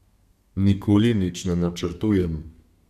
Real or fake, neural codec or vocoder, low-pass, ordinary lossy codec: fake; codec, 32 kHz, 1.9 kbps, SNAC; 14.4 kHz; none